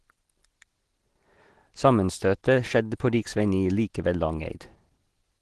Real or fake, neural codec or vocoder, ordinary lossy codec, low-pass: real; none; Opus, 16 kbps; 10.8 kHz